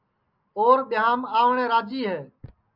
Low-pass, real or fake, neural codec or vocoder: 5.4 kHz; real; none